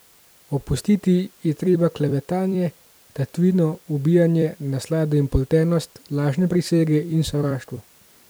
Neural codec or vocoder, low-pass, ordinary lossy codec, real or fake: vocoder, 44.1 kHz, 128 mel bands every 256 samples, BigVGAN v2; none; none; fake